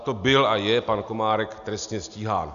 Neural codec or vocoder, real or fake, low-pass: none; real; 7.2 kHz